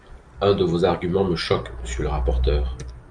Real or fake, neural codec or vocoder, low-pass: real; none; 9.9 kHz